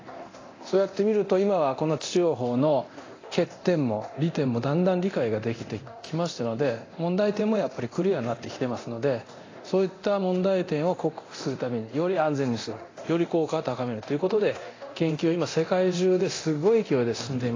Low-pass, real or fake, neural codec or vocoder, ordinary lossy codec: 7.2 kHz; fake; codec, 24 kHz, 0.9 kbps, DualCodec; AAC, 32 kbps